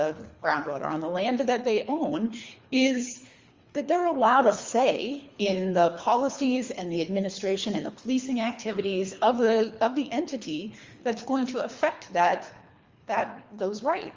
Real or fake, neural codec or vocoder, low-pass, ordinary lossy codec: fake; codec, 24 kHz, 3 kbps, HILCodec; 7.2 kHz; Opus, 32 kbps